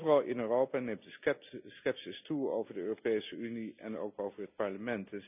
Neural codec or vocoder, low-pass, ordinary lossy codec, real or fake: none; 3.6 kHz; none; real